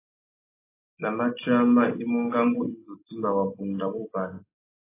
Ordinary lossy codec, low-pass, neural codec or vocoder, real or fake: AAC, 24 kbps; 3.6 kHz; none; real